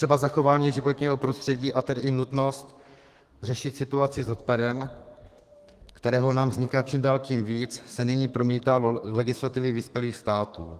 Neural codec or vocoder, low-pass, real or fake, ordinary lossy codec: codec, 32 kHz, 1.9 kbps, SNAC; 14.4 kHz; fake; Opus, 24 kbps